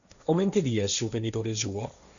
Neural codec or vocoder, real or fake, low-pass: codec, 16 kHz, 1.1 kbps, Voila-Tokenizer; fake; 7.2 kHz